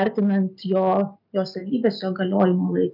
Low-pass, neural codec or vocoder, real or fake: 5.4 kHz; none; real